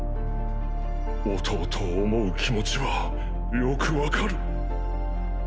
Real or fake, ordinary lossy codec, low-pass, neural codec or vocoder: real; none; none; none